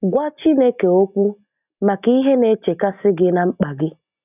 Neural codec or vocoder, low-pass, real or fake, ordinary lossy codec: none; 3.6 kHz; real; none